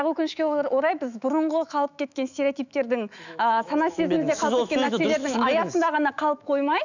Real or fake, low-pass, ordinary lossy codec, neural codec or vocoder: fake; 7.2 kHz; none; autoencoder, 48 kHz, 128 numbers a frame, DAC-VAE, trained on Japanese speech